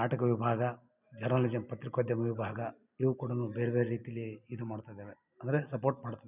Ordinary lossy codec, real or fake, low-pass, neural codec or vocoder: none; real; 3.6 kHz; none